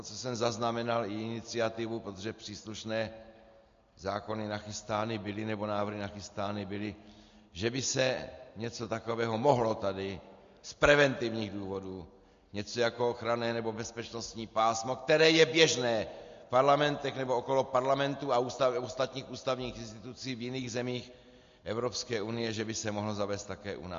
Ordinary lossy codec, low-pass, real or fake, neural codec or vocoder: MP3, 48 kbps; 7.2 kHz; real; none